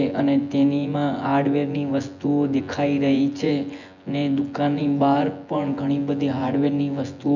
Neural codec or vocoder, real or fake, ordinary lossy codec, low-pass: vocoder, 24 kHz, 100 mel bands, Vocos; fake; none; 7.2 kHz